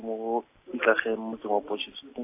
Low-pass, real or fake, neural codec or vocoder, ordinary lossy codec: 3.6 kHz; real; none; AAC, 24 kbps